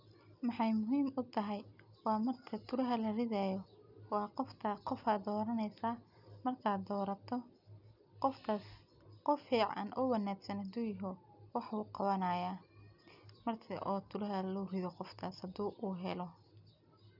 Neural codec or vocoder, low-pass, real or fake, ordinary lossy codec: none; 5.4 kHz; real; none